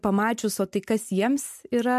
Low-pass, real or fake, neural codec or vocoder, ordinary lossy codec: 14.4 kHz; real; none; MP3, 64 kbps